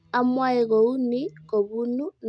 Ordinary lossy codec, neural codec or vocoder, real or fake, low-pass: none; none; real; 9.9 kHz